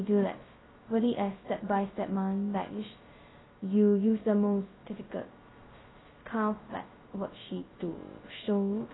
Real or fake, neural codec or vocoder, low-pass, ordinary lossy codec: fake; codec, 16 kHz, 0.2 kbps, FocalCodec; 7.2 kHz; AAC, 16 kbps